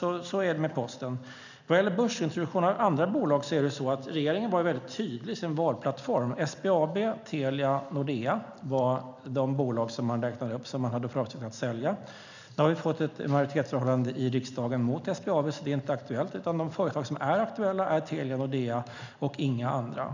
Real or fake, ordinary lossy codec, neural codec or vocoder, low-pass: real; none; none; 7.2 kHz